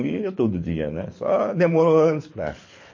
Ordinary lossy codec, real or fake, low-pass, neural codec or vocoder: MP3, 32 kbps; fake; 7.2 kHz; codec, 24 kHz, 6 kbps, HILCodec